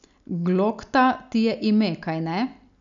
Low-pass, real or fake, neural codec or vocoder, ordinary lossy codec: 7.2 kHz; real; none; none